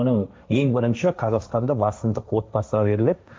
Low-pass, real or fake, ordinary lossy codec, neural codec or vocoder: none; fake; none; codec, 16 kHz, 1.1 kbps, Voila-Tokenizer